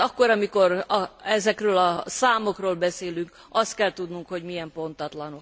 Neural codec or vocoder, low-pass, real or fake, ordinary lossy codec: none; none; real; none